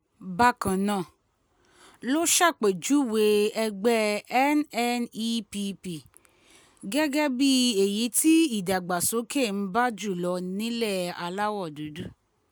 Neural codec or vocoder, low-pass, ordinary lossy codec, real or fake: none; none; none; real